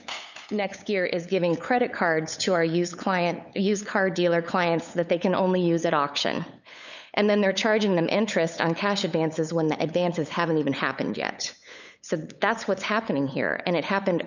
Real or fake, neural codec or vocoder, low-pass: fake; codec, 16 kHz, 8 kbps, FunCodec, trained on LibriTTS, 25 frames a second; 7.2 kHz